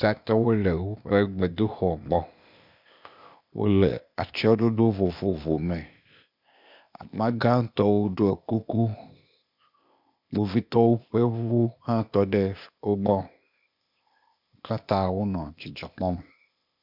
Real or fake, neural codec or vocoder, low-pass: fake; codec, 16 kHz, 0.8 kbps, ZipCodec; 5.4 kHz